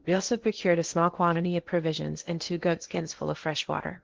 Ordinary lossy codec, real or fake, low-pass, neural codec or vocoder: Opus, 16 kbps; fake; 7.2 kHz; codec, 16 kHz in and 24 kHz out, 0.8 kbps, FocalCodec, streaming, 65536 codes